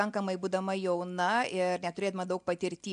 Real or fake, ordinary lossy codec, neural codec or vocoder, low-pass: real; MP3, 96 kbps; none; 9.9 kHz